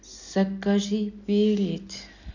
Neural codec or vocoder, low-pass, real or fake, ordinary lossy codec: none; 7.2 kHz; real; none